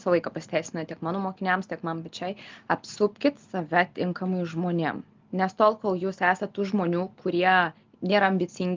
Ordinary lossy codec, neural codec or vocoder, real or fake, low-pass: Opus, 32 kbps; none; real; 7.2 kHz